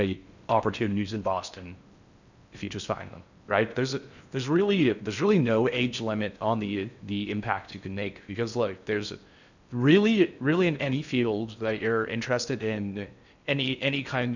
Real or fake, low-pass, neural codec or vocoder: fake; 7.2 kHz; codec, 16 kHz in and 24 kHz out, 0.6 kbps, FocalCodec, streaming, 4096 codes